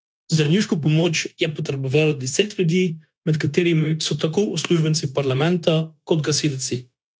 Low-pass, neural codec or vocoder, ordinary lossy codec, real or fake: none; codec, 16 kHz, 0.9 kbps, LongCat-Audio-Codec; none; fake